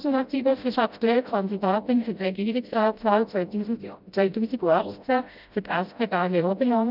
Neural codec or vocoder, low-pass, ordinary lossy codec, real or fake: codec, 16 kHz, 0.5 kbps, FreqCodec, smaller model; 5.4 kHz; none; fake